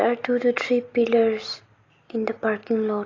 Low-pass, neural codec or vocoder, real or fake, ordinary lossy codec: 7.2 kHz; none; real; AAC, 32 kbps